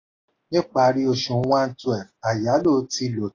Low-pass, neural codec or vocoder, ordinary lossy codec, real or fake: 7.2 kHz; none; AAC, 32 kbps; real